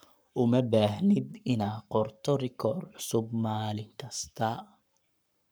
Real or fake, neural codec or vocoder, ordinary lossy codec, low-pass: fake; codec, 44.1 kHz, 7.8 kbps, Pupu-Codec; none; none